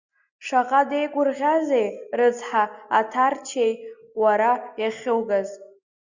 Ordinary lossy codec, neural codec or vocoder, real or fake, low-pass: Opus, 64 kbps; none; real; 7.2 kHz